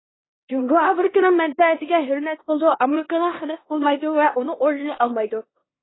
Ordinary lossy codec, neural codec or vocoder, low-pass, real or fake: AAC, 16 kbps; codec, 16 kHz in and 24 kHz out, 0.9 kbps, LongCat-Audio-Codec, four codebook decoder; 7.2 kHz; fake